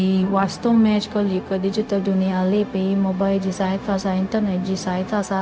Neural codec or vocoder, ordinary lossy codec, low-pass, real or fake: codec, 16 kHz, 0.4 kbps, LongCat-Audio-Codec; none; none; fake